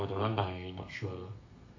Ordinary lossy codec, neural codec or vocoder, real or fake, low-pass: AAC, 48 kbps; codec, 32 kHz, 1.9 kbps, SNAC; fake; 7.2 kHz